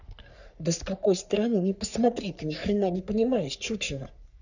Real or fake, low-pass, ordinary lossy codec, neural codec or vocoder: fake; 7.2 kHz; none; codec, 44.1 kHz, 3.4 kbps, Pupu-Codec